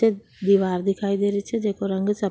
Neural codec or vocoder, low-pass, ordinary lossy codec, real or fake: none; none; none; real